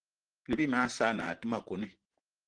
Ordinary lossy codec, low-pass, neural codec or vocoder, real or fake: Opus, 16 kbps; 9.9 kHz; vocoder, 44.1 kHz, 128 mel bands, Pupu-Vocoder; fake